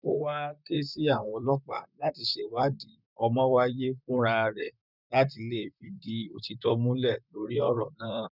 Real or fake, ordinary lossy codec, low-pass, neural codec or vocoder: fake; none; 5.4 kHz; vocoder, 44.1 kHz, 128 mel bands, Pupu-Vocoder